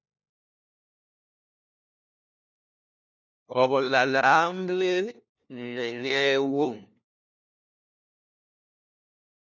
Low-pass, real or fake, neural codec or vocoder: 7.2 kHz; fake; codec, 16 kHz, 1 kbps, FunCodec, trained on LibriTTS, 50 frames a second